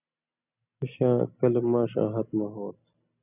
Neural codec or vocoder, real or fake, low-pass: none; real; 3.6 kHz